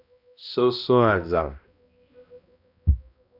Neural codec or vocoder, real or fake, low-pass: codec, 16 kHz, 1 kbps, X-Codec, HuBERT features, trained on balanced general audio; fake; 5.4 kHz